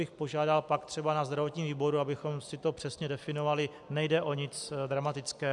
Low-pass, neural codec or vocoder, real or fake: 10.8 kHz; none; real